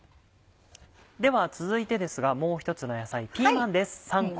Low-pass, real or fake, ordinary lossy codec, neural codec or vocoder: none; real; none; none